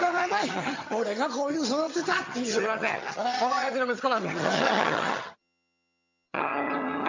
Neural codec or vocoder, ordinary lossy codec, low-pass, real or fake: vocoder, 22.05 kHz, 80 mel bands, HiFi-GAN; MP3, 64 kbps; 7.2 kHz; fake